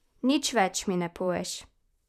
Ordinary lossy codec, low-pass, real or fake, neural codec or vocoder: none; 14.4 kHz; fake; vocoder, 44.1 kHz, 128 mel bands, Pupu-Vocoder